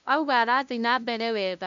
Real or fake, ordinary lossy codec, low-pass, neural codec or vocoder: fake; MP3, 96 kbps; 7.2 kHz; codec, 16 kHz, 0.5 kbps, FunCodec, trained on LibriTTS, 25 frames a second